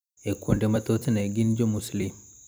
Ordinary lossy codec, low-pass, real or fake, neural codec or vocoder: none; none; fake; vocoder, 44.1 kHz, 128 mel bands every 256 samples, BigVGAN v2